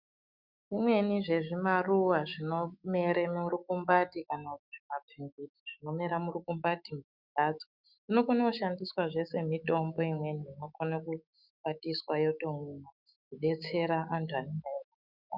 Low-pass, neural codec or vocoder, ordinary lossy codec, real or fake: 5.4 kHz; autoencoder, 48 kHz, 128 numbers a frame, DAC-VAE, trained on Japanese speech; Opus, 64 kbps; fake